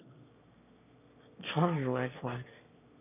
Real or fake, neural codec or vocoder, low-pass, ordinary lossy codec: fake; autoencoder, 22.05 kHz, a latent of 192 numbers a frame, VITS, trained on one speaker; 3.6 kHz; none